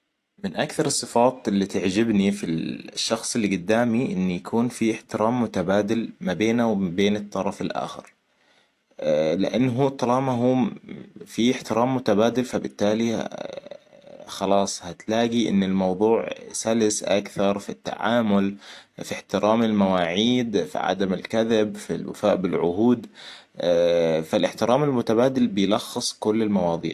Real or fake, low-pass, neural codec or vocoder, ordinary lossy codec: real; 14.4 kHz; none; AAC, 64 kbps